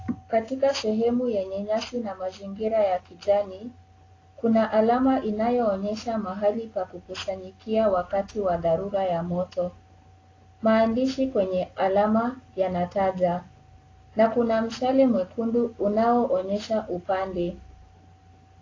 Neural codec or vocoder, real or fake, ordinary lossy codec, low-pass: none; real; AAC, 32 kbps; 7.2 kHz